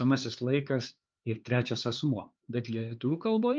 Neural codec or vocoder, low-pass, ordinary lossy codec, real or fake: codec, 16 kHz, 4 kbps, X-Codec, HuBERT features, trained on LibriSpeech; 7.2 kHz; Opus, 24 kbps; fake